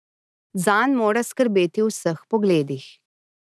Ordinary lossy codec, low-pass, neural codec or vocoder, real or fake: none; none; none; real